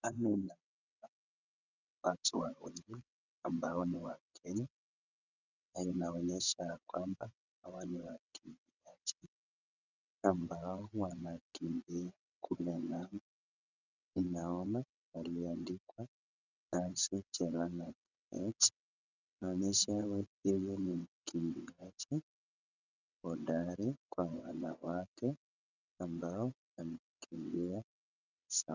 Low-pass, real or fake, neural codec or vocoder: 7.2 kHz; fake; vocoder, 22.05 kHz, 80 mel bands, WaveNeXt